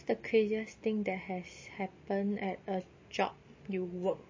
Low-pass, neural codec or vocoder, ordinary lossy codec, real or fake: 7.2 kHz; none; MP3, 32 kbps; real